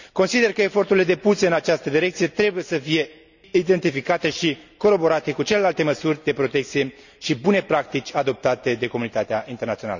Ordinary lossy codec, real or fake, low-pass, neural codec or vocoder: none; real; 7.2 kHz; none